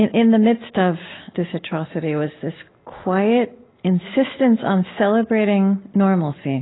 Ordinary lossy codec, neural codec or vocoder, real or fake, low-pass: AAC, 16 kbps; none; real; 7.2 kHz